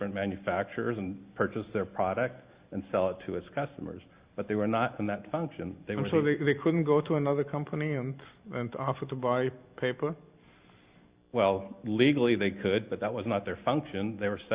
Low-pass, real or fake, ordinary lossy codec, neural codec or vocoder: 3.6 kHz; real; Opus, 64 kbps; none